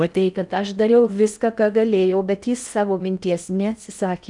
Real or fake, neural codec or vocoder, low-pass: fake; codec, 16 kHz in and 24 kHz out, 0.6 kbps, FocalCodec, streaming, 4096 codes; 10.8 kHz